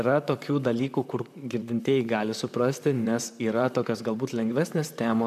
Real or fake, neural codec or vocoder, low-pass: fake; vocoder, 44.1 kHz, 128 mel bands, Pupu-Vocoder; 14.4 kHz